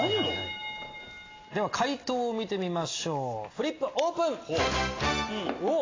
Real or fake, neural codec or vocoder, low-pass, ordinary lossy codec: real; none; 7.2 kHz; AAC, 32 kbps